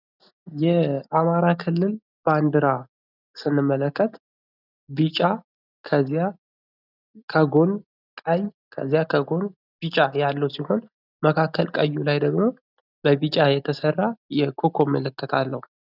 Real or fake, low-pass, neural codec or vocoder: real; 5.4 kHz; none